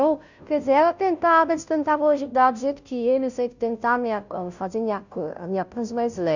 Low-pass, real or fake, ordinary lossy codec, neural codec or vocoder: 7.2 kHz; fake; none; codec, 16 kHz, 0.5 kbps, FunCodec, trained on Chinese and English, 25 frames a second